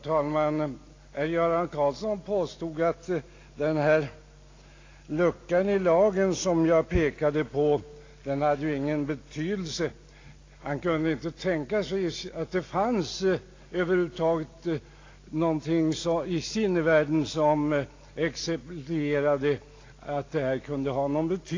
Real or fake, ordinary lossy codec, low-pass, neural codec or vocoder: real; AAC, 32 kbps; 7.2 kHz; none